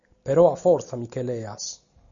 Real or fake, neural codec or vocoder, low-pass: real; none; 7.2 kHz